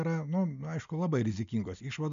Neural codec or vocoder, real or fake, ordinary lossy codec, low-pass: none; real; MP3, 64 kbps; 7.2 kHz